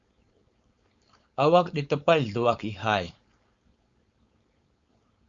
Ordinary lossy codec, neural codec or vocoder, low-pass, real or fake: Opus, 64 kbps; codec, 16 kHz, 4.8 kbps, FACodec; 7.2 kHz; fake